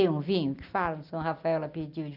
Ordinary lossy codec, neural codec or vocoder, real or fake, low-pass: none; none; real; 5.4 kHz